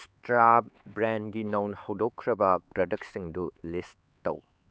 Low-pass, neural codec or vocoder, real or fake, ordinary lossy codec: none; codec, 16 kHz, 4 kbps, X-Codec, HuBERT features, trained on LibriSpeech; fake; none